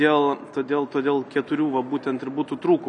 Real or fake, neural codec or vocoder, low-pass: real; none; 9.9 kHz